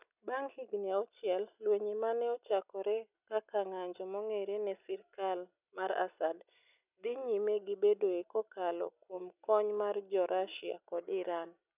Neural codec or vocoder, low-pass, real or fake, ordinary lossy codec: none; 3.6 kHz; real; none